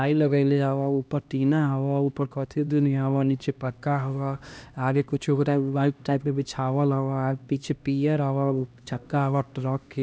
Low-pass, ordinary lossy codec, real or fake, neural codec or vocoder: none; none; fake; codec, 16 kHz, 1 kbps, X-Codec, HuBERT features, trained on LibriSpeech